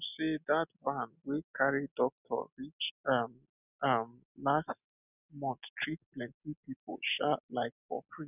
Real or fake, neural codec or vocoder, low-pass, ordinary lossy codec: real; none; 3.6 kHz; none